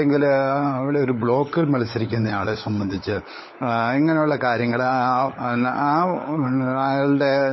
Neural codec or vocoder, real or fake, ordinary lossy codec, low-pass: codec, 16 kHz, 16 kbps, FunCodec, trained on LibriTTS, 50 frames a second; fake; MP3, 24 kbps; 7.2 kHz